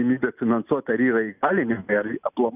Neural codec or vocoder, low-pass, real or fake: none; 3.6 kHz; real